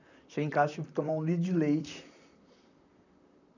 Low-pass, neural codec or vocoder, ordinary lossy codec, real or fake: 7.2 kHz; vocoder, 44.1 kHz, 128 mel bands, Pupu-Vocoder; none; fake